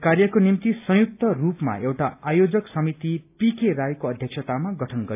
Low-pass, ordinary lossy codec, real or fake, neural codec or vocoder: 3.6 kHz; AAC, 32 kbps; real; none